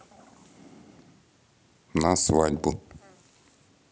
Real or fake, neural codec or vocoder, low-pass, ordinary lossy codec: real; none; none; none